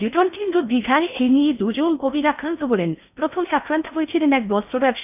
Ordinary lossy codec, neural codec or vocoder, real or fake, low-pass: none; codec, 16 kHz in and 24 kHz out, 0.6 kbps, FocalCodec, streaming, 4096 codes; fake; 3.6 kHz